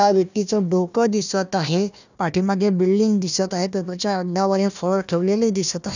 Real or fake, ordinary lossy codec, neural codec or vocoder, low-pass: fake; none; codec, 16 kHz, 1 kbps, FunCodec, trained on Chinese and English, 50 frames a second; 7.2 kHz